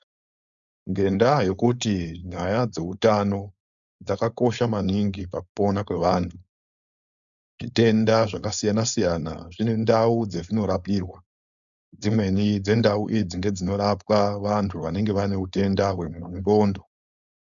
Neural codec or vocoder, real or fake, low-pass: codec, 16 kHz, 4.8 kbps, FACodec; fake; 7.2 kHz